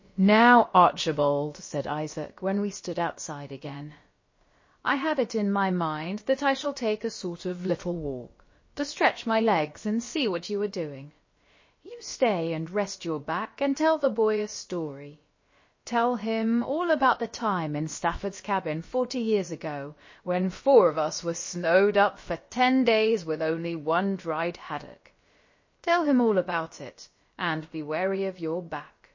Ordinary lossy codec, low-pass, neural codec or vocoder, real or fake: MP3, 32 kbps; 7.2 kHz; codec, 16 kHz, about 1 kbps, DyCAST, with the encoder's durations; fake